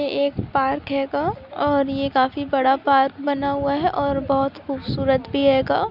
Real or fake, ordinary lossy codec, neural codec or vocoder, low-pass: real; none; none; 5.4 kHz